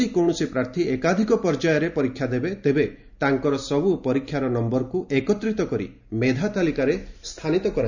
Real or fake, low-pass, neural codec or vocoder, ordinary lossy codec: real; 7.2 kHz; none; none